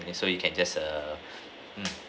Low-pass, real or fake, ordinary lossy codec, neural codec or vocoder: none; real; none; none